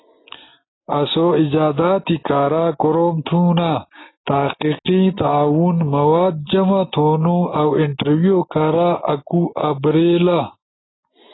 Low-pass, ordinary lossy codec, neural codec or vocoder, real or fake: 7.2 kHz; AAC, 16 kbps; none; real